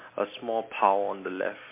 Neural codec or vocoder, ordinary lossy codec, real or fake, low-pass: none; MP3, 24 kbps; real; 3.6 kHz